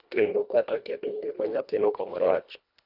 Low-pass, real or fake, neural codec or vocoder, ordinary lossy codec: 5.4 kHz; fake; codec, 24 kHz, 1.5 kbps, HILCodec; none